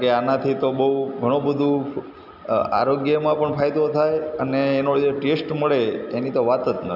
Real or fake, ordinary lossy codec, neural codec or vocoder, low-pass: real; none; none; 5.4 kHz